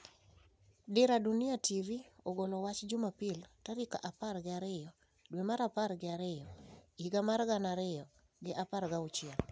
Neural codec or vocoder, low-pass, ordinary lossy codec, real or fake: none; none; none; real